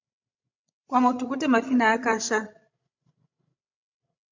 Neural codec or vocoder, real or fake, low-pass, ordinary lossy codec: codec, 16 kHz, 16 kbps, FreqCodec, larger model; fake; 7.2 kHz; MP3, 64 kbps